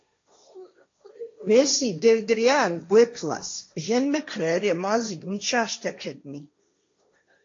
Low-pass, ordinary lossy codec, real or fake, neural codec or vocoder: 7.2 kHz; AAC, 48 kbps; fake; codec, 16 kHz, 1.1 kbps, Voila-Tokenizer